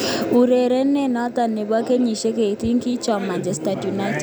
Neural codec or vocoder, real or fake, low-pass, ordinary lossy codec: none; real; none; none